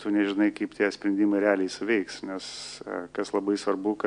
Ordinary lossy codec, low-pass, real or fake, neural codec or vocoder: AAC, 64 kbps; 9.9 kHz; real; none